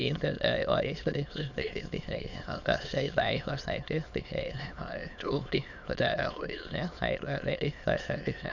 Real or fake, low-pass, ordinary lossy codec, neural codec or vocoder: fake; 7.2 kHz; none; autoencoder, 22.05 kHz, a latent of 192 numbers a frame, VITS, trained on many speakers